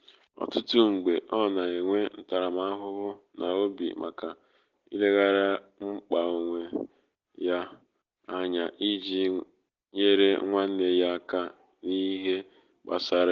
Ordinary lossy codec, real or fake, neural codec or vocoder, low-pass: Opus, 16 kbps; real; none; 7.2 kHz